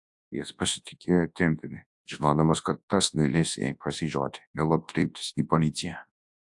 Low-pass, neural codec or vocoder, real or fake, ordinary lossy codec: 10.8 kHz; codec, 24 kHz, 0.9 kbps, WavTokenizer, large speech release; fake; AAC, 64 kbps